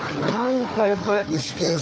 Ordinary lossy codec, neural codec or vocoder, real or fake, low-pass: none; codec, 16 kHz, 4 kbps, FunCodec, trained on Chinese and English, 50 frames a second; fake; none